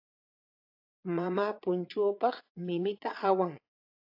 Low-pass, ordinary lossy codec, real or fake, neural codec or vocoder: 5.4 kHz; AAC, 48 kbps; fake; vocoder, 22.05 kHz, 80 mel bands, Vocos